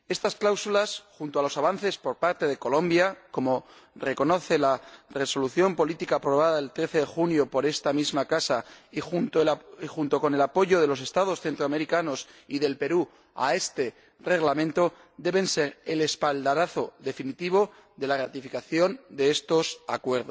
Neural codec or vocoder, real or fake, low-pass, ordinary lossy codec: none; real; none; none